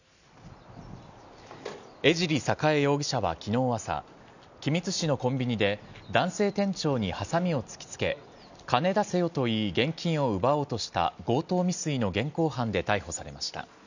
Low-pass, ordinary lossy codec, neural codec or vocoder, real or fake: 7.2 kHz; none; none; real